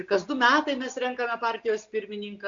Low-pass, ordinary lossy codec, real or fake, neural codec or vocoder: 7.2 kHz; MP3, 64 kbps; real; none